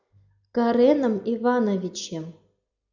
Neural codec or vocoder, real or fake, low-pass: none; real; 7.2 kHz